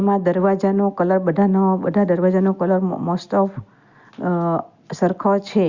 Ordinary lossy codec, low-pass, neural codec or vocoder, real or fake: Opus, 64 kbps; 7.2 kHz; none; real